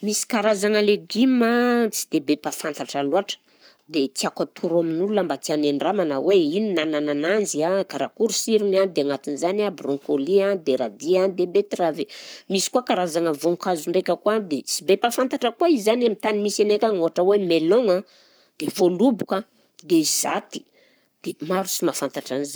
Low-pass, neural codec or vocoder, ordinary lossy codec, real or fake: none; codec, 44.1 kHz, 7.8 kbps, Pupu-Codec; none; fake